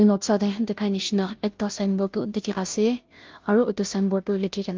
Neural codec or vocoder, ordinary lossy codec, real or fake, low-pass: codec, 16 kHz, 0.5 kbps, FunCodec, trained on Chinese and English, 25 frames a second; Opus, 32 kbps; fake; 7.2 kHz